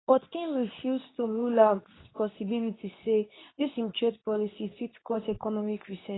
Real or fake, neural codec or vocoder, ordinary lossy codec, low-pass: fake; codec, 24 kHz, 0.9 kbps, WavTokenizer, medium speech release version 2; AAC, 16 kbps; 7.2 kHz